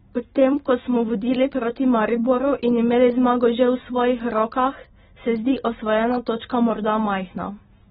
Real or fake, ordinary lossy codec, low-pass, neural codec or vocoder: real; AAC, 16 kbps; 9.9 kHz; none